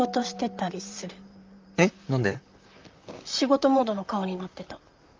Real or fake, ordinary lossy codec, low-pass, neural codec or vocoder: fake; Opus, 24 kbps; 7.2 kHz; vocoder, 44.1 kHz, 128 mel bands, Pupu-Vocoder